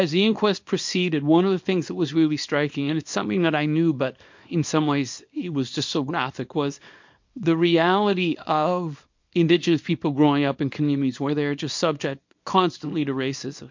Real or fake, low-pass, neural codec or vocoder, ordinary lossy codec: fake; 7.2 kHz; codec, 24 kHz, 0.9 kbps, WavTokenizer, small release; MP3, 48 kbps